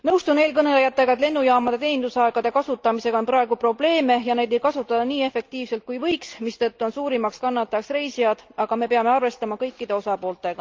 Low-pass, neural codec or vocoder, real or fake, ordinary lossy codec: 7.2 kHz; none; real; Opus, 24 kbps